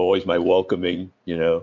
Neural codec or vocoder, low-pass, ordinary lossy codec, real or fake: none; 7.2 kHz; MP3, 48 kbps; real